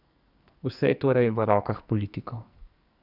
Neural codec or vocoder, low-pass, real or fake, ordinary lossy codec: codec, 44.1 kHz, 2.6 kbps, SNAC; 5.4 kHz; fake; none